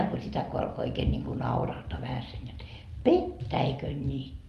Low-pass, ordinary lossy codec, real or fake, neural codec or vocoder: 14.4 kHz; Opus, 32 kbps; real; none